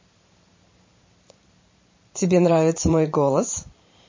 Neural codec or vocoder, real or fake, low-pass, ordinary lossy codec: vocoder, 44.1 kHz, 128 mel bands every 512 samples, BigVGAN v2; fake; 7.2 kHz; MP3, 32 kbps